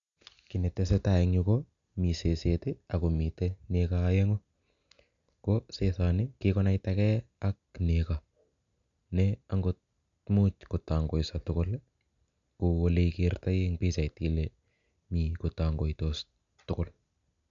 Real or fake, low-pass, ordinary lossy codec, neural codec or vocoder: real; 7.2 kHz; none; none